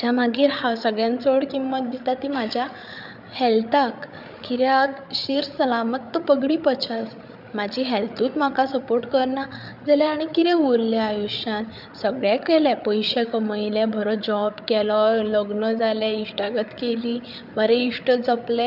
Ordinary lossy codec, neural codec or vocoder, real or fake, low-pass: none; codec, 16 kHz, 8 kbps, FreqCodec, larger model; fake; 5.4 kHz